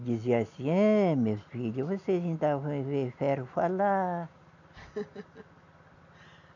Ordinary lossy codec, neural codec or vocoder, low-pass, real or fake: none; none; 7.2 kHz; real